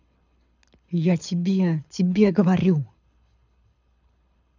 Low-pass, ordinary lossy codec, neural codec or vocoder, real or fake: 7.2 kHz; none; codec, 24 kHz, 6 kbps, HILCodec; fake